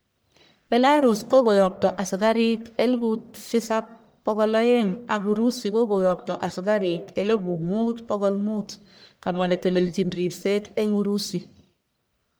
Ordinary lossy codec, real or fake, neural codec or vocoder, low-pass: none; fake; codec, 44.1 kHz, 1.7 kbps, Pupu-Codec; none